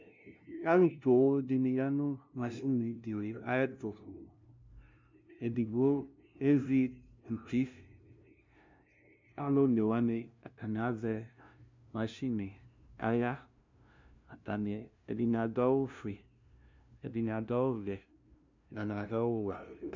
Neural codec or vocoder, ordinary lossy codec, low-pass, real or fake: codec, 16 kHz, 0.5 kbps, FunCodec, trained on LibriTTS, 25 frames a second; MP3, 64 kbps; 7.2 kHz; fake